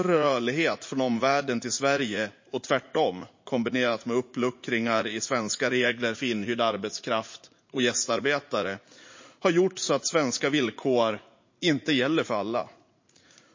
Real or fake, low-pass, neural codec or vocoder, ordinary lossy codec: fake; 7.2 kHz; vocoder, 44.1 kHz, 80 mel bands, Vocos; MP3, 32 kbps